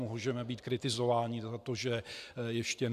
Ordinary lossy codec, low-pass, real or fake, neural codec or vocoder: AAC, 96 kbps; 14.4 kHz; real; none